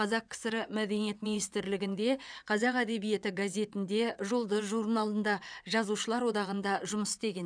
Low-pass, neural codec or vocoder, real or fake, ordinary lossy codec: 9.9 kHz; vocoder, 22.05 kHz, 80 mel bands, WaveNeXt; fake; none